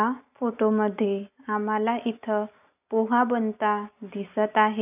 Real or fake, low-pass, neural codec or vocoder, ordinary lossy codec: real; 3.6 kHz; none; none